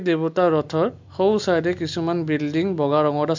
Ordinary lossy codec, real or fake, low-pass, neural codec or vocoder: MP3, 64 kbps; real; 7.2 kHz; none